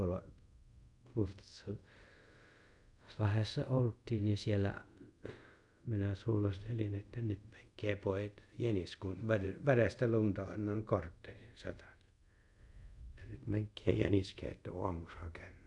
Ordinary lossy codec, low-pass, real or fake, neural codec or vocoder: none; 10.8 kHz; fake; codec, 24 kHz, 0.5 kbps, DualCodec